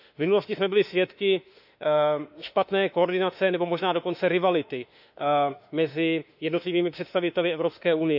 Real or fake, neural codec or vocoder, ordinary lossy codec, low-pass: fake; autoencoder, 48 kHz, 32 numbers a frame, DAC-VAE, trained on Japanese speech; none; 5.4 kHz